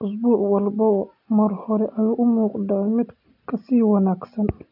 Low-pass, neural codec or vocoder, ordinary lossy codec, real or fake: 5.4 kHz; none; none; real